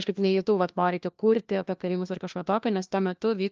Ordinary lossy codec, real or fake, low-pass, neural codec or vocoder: Opus, 24 kbps; fake; 7.2 kHz; codec, 16 kHz, 1 kbps, FunCodec, trained on LibriTTS, 50 frames a second